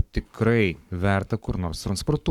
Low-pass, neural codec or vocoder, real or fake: 19.8 kHz; codec, 44.1 kHz, 7.8 kbps, Pupu-Codec; fake